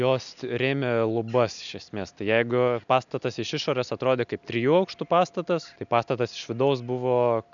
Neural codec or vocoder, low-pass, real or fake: none; 7.2 kHz; real